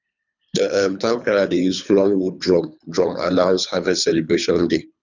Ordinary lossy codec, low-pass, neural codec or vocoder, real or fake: none; 7.2 kHz; codec, 24 kHz, 3 kbps, HILCodec; fake